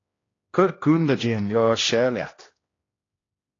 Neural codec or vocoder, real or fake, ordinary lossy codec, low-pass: codec, 16 kHz, 1 kbps, X-Codec, HuBERT features, trained on general audio; fake; AAC, 32 kbps; 7.2 kHz